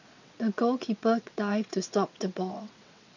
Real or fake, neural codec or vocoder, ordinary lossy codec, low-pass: fake; vocoder, 22.05 kHz, 80 mel bands, WaveNeXt; none; 7.2 kHz